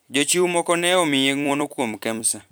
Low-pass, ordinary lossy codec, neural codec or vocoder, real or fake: none; none; vocoder, 44.1 kHz, 128 mel bands every 256 samples, BigVGAN v2; fake